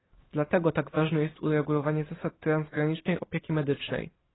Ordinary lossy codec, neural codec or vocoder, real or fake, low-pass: AAC, 16 kbps; none; real; 7.2 kHz